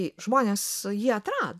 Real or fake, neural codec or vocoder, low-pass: real; none; 14.4 kHz